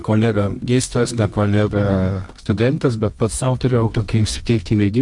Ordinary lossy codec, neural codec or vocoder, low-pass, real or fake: MP3, 64 kbps; codec, 24 kHz, 0.9 kbps, WavTokenizer, medium music audio release; 10.8 kHz; fake